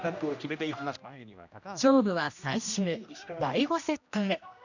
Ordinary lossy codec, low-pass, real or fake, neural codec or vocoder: none; 7.2 kHz; fake; codec, 16 kHz, 1 kbps, X-Codec, HuBERT features, trained on general audio